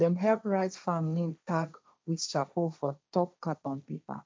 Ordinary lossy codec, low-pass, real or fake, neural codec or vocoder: none; none; fake; codec, 16 kHz, 1.1 kbps, Voila-Tokenizer